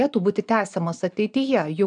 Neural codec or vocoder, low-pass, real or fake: none; 9.9 kHz; real